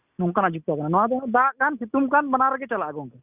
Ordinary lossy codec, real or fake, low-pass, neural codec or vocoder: Opus, 64 kbps; real; 3.6 kHz; none